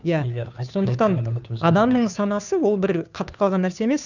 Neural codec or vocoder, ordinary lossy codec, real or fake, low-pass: codec, 16 kHz, 2 kbps, FunCodec, trained on Chinese and English, 25 frames a second; none; fake; 7.2 kHz